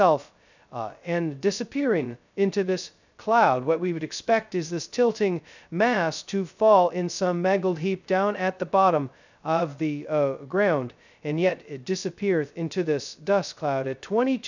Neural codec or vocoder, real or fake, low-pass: codec, 16 kHz, 0.2 kbps, FocalCodec; fake; 7.2 kHz